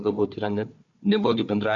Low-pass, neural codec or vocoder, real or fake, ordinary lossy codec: 7.2 kHz; codec, 16 kHz, 4 kbps, FunCodec, trained on Chinese and English, 50 frames a second; fake; Opus, 64 kbps